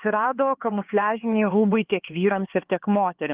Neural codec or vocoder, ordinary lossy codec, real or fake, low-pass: codec, 16 kHz, 4 kbps, X-Codec, HuBERT features, trained on LibriSpeech; Opus, 16 kbps; fake; 3.6 kHz